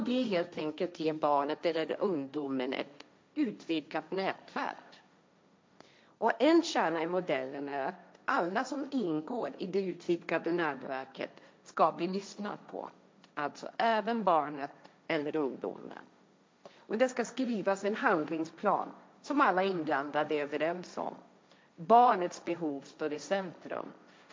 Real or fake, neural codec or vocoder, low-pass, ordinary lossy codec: fake; codec, 16 kHz, 1.1 kbps, Voila-Tokenizer; none; none